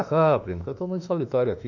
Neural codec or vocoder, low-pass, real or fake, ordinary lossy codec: autoencoder, 48 kHz, 32 numbers a frame, DAC-VAE, trained on Japanese speech; 7.2 kHz; fake; none